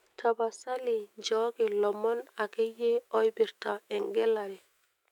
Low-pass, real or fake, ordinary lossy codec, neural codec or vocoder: 19.8 kHz; fake; MP3, 96 kbps; autoencoder, 48 kHz, 128 numbers a frame, DAC-VAE, trained on Japanese speech